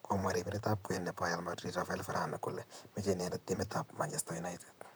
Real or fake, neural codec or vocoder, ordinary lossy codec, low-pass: fake; vocoder, 44.1 kHz, 128 mel bands, Pupu-Vocoder; none; none